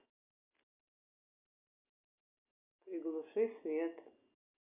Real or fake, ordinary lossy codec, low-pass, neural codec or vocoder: real; Opus, 64 kbps; 3.6 kHz; none